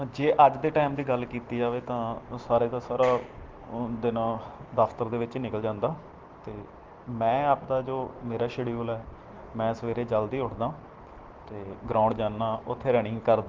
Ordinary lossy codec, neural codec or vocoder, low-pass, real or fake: Opus, 16 kbps; none; 7.2 kHz; real